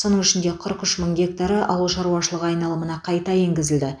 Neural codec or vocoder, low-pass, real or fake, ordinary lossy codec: none; 9.9 kHz; real; none